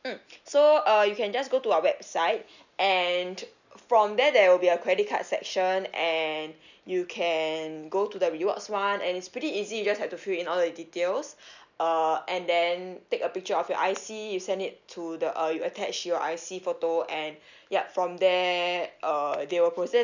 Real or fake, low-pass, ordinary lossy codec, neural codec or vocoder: real; 7.2 kHz; none; none